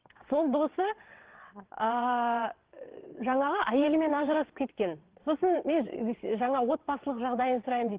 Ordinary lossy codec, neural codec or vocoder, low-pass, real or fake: Opus, 16 kbps; vocoder, 22.05 kHz, 80 mel bands, WaveNeXt; 3.6 kHz; fake